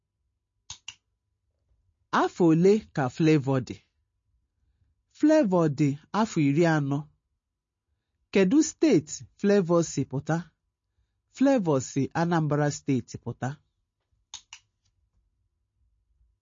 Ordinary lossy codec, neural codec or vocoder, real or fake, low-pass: MP3, 32 kbps; none; real; 7.2 kHz